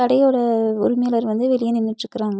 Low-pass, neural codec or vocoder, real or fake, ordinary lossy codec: none; none; real; none